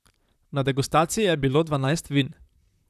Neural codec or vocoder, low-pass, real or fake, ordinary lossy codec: vocoder, 44.1 kHz, 128 mel bands, Pupu-Vocoder; 14.4 kHz; fake; none